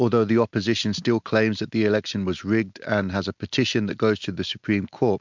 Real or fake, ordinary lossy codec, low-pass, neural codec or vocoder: real; MP3, 64 kbps; 7.2 kHz; none